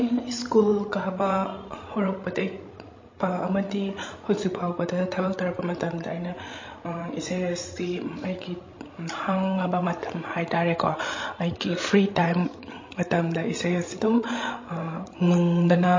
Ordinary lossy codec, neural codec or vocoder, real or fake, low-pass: MP3, 32 kbps; codec, 16 kHz, 16 kbps, FreqCodec, larger model; fake; 7.2 kHz